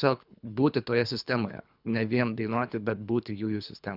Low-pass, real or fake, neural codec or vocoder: 5.4 kHz; fake; codec, 24 kHz, 3 kbps, HILCodec